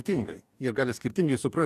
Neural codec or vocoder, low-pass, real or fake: codec, 44.1 kHz, 2.6 kbps, DAC; 14.4 kHz; fake